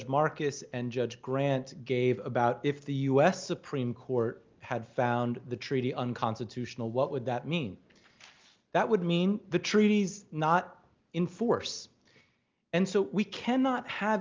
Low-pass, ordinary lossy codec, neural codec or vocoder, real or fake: 7.2 kHz; Opus, 24 kbps; none; real